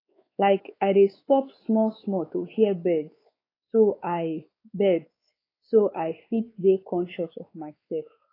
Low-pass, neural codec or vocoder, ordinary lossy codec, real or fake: 5.4 kHz; codec, 16 kHz, 4 kbps, X-Codec, WavLM features, trained on Multilingual LibriSpeech; AAC, 24 kbps; fake